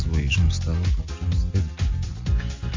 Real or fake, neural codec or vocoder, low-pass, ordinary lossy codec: real; none; 7.2 kHz; MP3, 48 kbps